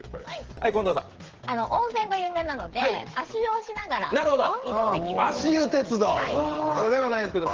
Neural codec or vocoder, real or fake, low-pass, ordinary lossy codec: codec, 16 kHz, 8 kbps, FreqCodec, smaller model; fake; 7.2 kHz; Opus, 16 kbps